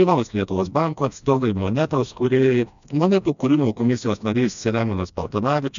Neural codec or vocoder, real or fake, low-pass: codec, 16 kHz, 1 kbps, FreqCodec, smaller model; fake; 7.2 kHz